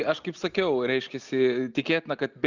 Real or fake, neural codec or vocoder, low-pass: real; none; 7.2 kHz